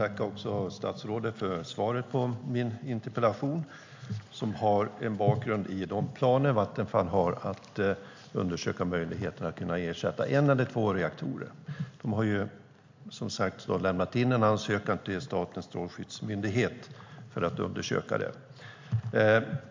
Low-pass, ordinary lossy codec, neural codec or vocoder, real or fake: 7.2 kHz; MP3, 64 kbps; none; real